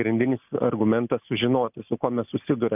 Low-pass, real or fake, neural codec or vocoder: 3.6 kHz; real; none